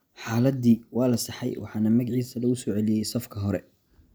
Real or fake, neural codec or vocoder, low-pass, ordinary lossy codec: real; none; none; none